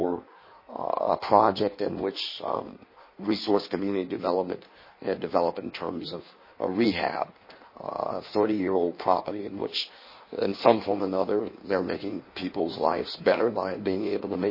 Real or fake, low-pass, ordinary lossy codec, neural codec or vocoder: fake; 5.4 kHz; MP3, 24 kbps; codec, 16 kHz in and 24 kHz out, 1.1 kbps, FireRedTTS-2 codec